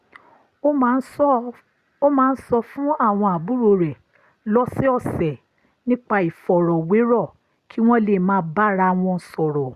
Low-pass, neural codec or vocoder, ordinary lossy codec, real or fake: 14.4 kHz; none; Opus, 64 kbps; real